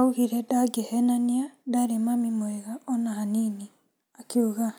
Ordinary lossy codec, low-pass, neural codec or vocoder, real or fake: none; none; none; real